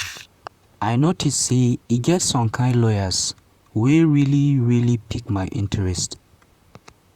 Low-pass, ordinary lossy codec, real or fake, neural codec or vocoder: 19.8 kHz; Opus, 64 kbps; fake; vocoder, 44.1 kHz, 128 mel bands, Pupu-Vocoder